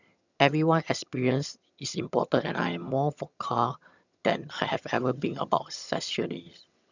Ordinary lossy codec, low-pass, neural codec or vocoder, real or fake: none; 7.2 kHz; vocoder, 22.05 kHz, 80 mel bands, HiFi-GAN; fake